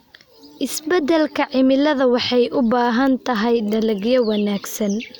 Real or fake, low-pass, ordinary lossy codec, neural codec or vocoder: real; none; none; none